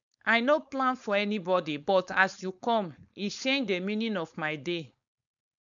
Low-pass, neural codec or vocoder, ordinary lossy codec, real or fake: 7.2 kHz; codec, 16 kHz, 4.8 kbps, FACodec; MP3, 96 kbps; fake